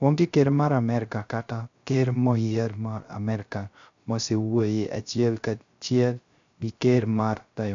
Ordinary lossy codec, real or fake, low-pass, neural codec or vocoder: AAC, 64 kbps; fake; 7.2 kHz; codec, 16 kHz, 0.3 kbps, FocalCodec